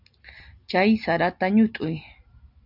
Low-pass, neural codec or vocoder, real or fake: 5.4 kHz; none; real